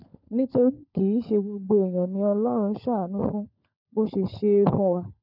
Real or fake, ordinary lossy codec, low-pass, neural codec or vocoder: fake; none; 5.4 kHz; codec, 16 kHz, 16 kbps, FunCodec, trained on LibriTTS, 50 frames a second